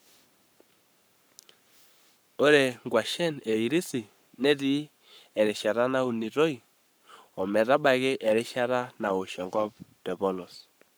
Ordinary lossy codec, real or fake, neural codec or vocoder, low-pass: none; fake; codec, 44.1 kHz, 7.8 kbps, Pupu-Codec; none